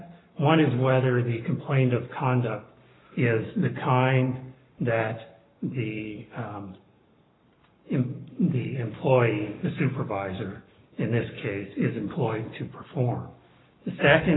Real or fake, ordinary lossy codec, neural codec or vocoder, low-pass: fake; AAC, 16 kbps; codec, 44.1 kHz, 7.8 kbps, Pupu-Codec; 7.2 kHz